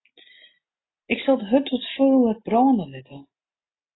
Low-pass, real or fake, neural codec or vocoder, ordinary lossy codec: 7.2 kHz; real; none; AAC, 16 kbps